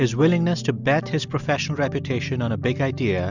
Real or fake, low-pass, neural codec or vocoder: real; 7.2 kHz; none